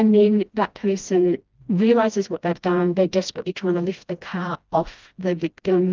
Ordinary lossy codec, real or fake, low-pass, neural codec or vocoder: Opus, 24 kbps; fake; 7.2 kHz; codec, 16 kHz, 1 kbps, FreqCodec, smaller model